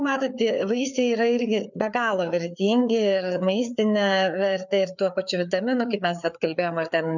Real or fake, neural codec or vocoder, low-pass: fake; codec, 16 kHz, 4 kbps, FreqCodec, larger model; 7.2 kHz